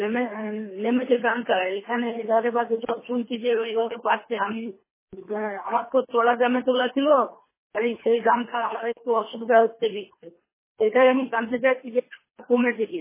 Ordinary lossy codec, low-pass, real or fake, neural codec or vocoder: MP3, 16 kbps; 3.6 kHz; fake; codec, 24 kHz, 3 kbps, HILCodec